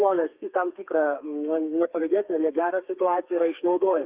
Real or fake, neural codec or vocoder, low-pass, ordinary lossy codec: fake; codec, 32 kHz, 1.9 kbps, SNAC; 3.6 kHz; Opus, 24 kbps